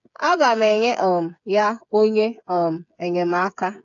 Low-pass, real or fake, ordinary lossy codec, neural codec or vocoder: 7.2 kHz; fake; AAC, 64 kbps; codec, 16 kHz, 8 kbps, FreqCodec, smaller model